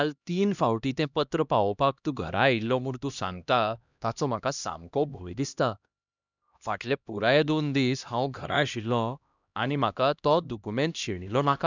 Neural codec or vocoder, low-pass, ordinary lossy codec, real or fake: codec, 16 kHz, 1 kbps, X-Codec, HuBERT features, trained on LibriSpeech; 7.2 kHz; none; fake